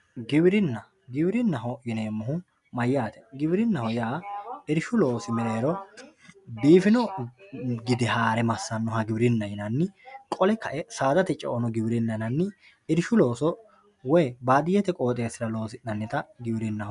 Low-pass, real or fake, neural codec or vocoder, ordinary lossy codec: 10.8 kHz; real; none; MP3, 96 kbps